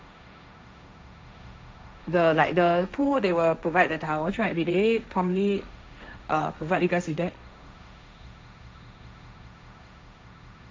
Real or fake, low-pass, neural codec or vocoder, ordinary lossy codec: fake; none; codec, 16 kHz, 1.1 kbps, Voila-Tokenizer; none